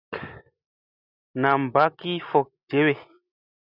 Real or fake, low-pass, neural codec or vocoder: real; 5.4 kHz; none